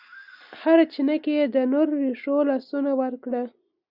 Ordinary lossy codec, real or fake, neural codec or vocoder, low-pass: Opus, 64 kbps; real; none; 5.4 kHz